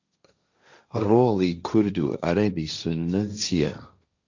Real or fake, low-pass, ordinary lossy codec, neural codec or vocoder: fake; 7.2 kHz; Opus, 64 kbps; codec, 16 kHz, 1.1 kbps, Voila-Tokenizer